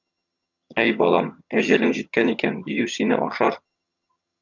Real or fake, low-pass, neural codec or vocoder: fake; 7.2 kHz; vocoder, 22.05 kHz, 80 mel bands, HiFi-GAN